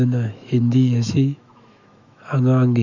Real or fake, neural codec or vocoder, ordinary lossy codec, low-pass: fake; autoencoder, 48 kHz, 128 numbers a frame, DAC-VAE, trained on Japanese speech; none; 7.2 kHz